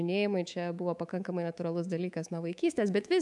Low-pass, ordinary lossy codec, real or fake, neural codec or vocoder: 10.8 kHz; MP3, 96 kbps; fake; codec, 24 kHz, 3.1 kbps, DualCodec